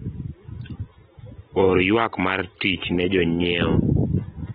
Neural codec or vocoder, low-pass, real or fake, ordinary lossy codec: none; 19.8 kHz; real; AAC, 16 kbps